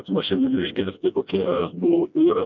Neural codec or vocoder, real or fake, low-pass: codec, 16 kHz, 1 kbps, FreqCodec, smaller model; fake; 7.2 kHz